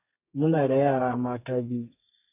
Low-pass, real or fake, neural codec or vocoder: 3.6 kHz; fake; codec, 32 kHz, 1.9 kbps, SNAC